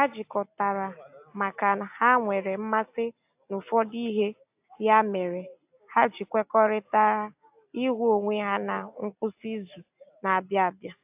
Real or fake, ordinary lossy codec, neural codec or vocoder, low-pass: real; AAC, 32 kbps; none; 3.6 kHz